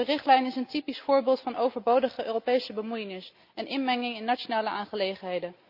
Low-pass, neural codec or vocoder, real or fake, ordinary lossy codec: 5.4 kHz; none; real; Opus, 64 kbps